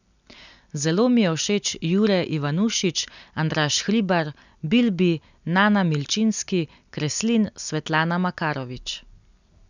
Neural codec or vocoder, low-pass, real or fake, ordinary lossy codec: none; 7.2 kHz; real; none